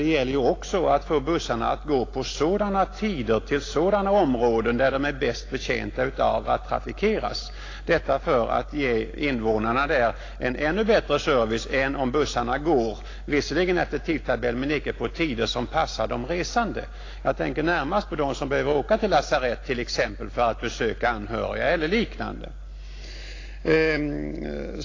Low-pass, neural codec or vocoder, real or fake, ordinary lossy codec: 7.2 kHz; none; real; AAC, 32 kbps